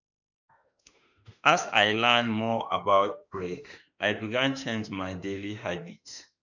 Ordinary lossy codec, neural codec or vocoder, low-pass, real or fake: none; autoencoder, 48 kHz, 32 numbers a frame, DAC-VAE, trained on Japanese speech; 7.2 kHz; fake